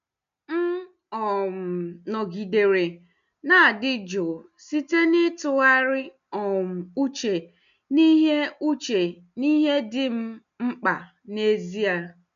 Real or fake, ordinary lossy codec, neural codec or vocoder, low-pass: real; none; none; 7.2 kHz